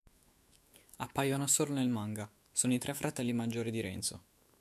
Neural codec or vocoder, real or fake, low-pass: autoencoder, 48 kHz, 128 numbers a frame, DAC-VAE, trained on Japanese speech; fake; 14.4 kHz